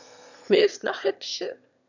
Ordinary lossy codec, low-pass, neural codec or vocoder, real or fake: none; 7.2 kHz; autoencoder, 22.05 kHz, a latent of 192 numbers a frame, VITS, trained on one speaker; fake